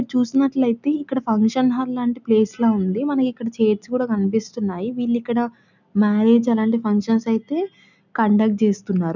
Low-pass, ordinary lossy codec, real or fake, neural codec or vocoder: 7.2 kHz; none; real; none